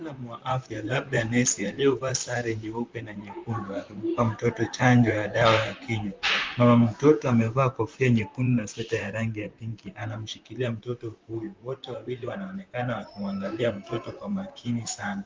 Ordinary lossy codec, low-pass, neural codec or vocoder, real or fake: Opus, 24 kbps; 7.2 kHz; vocoder, 44.1 kHz, 128 mel bands, Pupu-Vocoder; fake